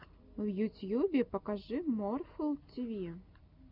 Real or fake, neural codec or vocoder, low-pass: real; none; 5.4 kHz